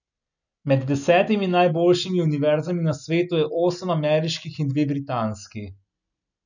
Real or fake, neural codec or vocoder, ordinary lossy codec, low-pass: real; none; none; 7.2 kHz